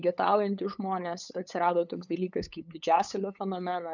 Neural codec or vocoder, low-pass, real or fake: codec, 16 kHz, 8 kbps, FunCodec, trained on LibriTTS, 25 frames a second; 7.2 kHz; fake